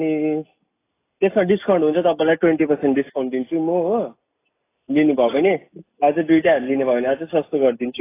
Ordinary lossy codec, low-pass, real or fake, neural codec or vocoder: AAC, 24 kbps; 3.6 kHz; real; none